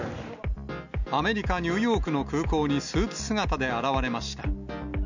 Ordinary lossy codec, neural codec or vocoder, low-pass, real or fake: none; none; 7.2 kHz; real